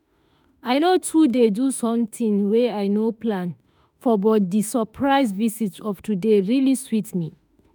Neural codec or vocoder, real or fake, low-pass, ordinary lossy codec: autoencoder, 48 kHz, 32 numbers a frame, DAC-VAE, trained on Japanese speech; fake; none; none